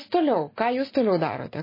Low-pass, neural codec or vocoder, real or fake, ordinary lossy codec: 5.4 kHz; none; real; MP3, 24 kbps